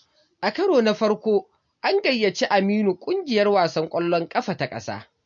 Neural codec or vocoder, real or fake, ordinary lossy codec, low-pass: none; real; MP3, 48 kbps; 7.2 kHz